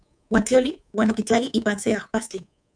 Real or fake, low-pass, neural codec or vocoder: fake; 9.9 kHz; codec, 24 kHz, 3.1 kbps, DualCodec